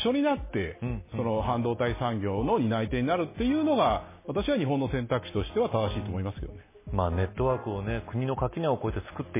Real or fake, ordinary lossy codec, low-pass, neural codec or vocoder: real; MP3, 16 kbps; 3.6 kHz; none